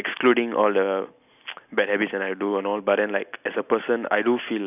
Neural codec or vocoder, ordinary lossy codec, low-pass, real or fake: none; none; 3.6 kHz; real